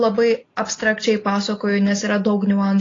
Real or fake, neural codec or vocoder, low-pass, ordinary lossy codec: real; none; 7.2 kHz; AAC, 32 kbps